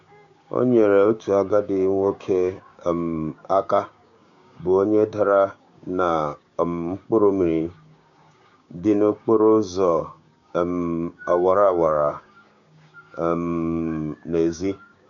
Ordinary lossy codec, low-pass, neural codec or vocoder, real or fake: MP3, 64 kbps; 7.2 kHz; codec, 16 kHz, 6 kbps, DAC; fake